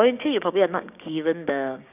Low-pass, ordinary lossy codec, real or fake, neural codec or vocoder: 3.6 kHz; none; fake; codec, 16 kHz, 6 kbps, DAC